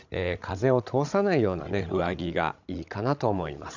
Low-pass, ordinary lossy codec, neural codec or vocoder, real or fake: 7.2 kHz; none; codec, 16 kHz, 16 kbps, FreqCodec, larger model; fake